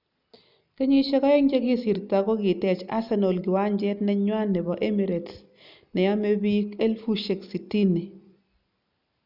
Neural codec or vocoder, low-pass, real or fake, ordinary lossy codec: none; 5.4 kHz; real; none